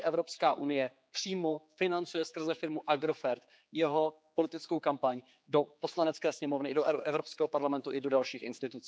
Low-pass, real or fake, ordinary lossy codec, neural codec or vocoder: none; fake; none; codec, 16 kHz, 4 kbps, X-Codec, HuBERT features, trained on general audio